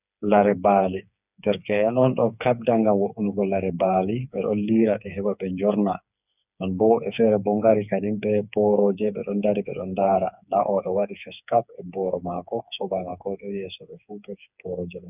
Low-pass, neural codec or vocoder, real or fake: 3.6 kHz; codec, 16 kHz, 4 kbps, FreqCodec, smaller model; fake